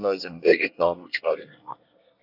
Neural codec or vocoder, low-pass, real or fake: codec, 24 kHz, 1 kbps, SNAC; 5.4 kHz; fake